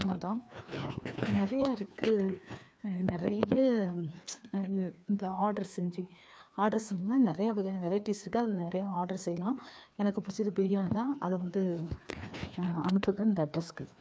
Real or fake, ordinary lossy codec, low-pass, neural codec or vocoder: fake; none; none; codec, 16 kHz, 2 kbps, FreqCodec, larger model